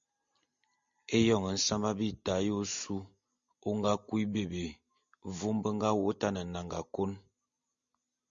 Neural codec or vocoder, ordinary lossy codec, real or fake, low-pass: none; MP3, 64 kbps; real; 7.2 kHz